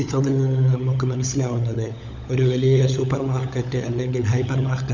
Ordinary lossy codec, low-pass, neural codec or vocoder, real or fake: none; 7.2 kHz; codec, 16 kHz, 16 kbps, FunCodec, trained on LibriTTS, 50 frames a second; fake